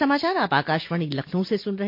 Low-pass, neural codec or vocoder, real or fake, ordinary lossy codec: 5.4 kHz; none; real; MP3, 32 kbps